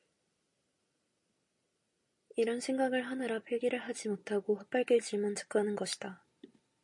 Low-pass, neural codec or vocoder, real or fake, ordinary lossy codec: 10.8 kHz; none; real; MP3, 48 kbps